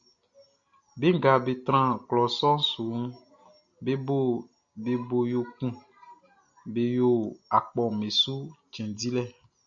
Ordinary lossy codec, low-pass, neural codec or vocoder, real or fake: MP3, 64 kbps; 7.2 kHz; none; real